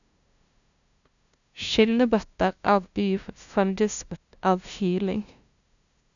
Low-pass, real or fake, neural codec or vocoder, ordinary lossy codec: 7.2 kHz; fake; codec, 16 kHz, 0.5 kbps, FunCodec, trained on LibriTTS, 25 frames a second; none